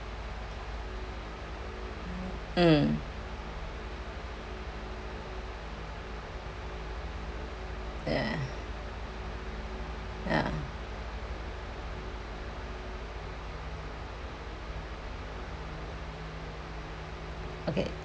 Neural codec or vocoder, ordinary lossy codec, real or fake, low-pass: none; none; real; none